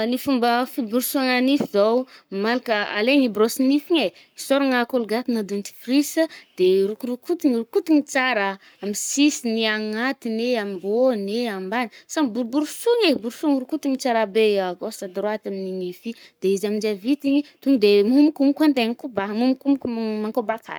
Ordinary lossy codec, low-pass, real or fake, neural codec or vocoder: none; none; fake; codec, 44.1 kHz, 7.8 kbps, Pupu-Codec